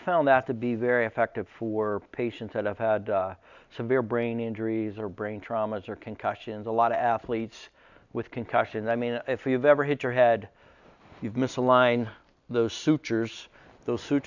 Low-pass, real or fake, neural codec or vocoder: 7.2 kHz; real; none